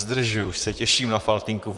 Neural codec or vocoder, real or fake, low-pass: vocoder, 44.1 kHz, 128 mel bands, Pupu-Vocoder; fake; 10.8 kHz